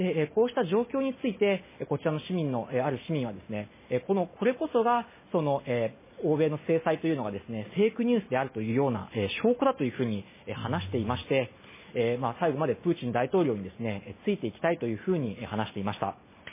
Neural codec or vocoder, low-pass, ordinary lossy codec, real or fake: none; 3.6 kHz; MP3, 16 kbps; real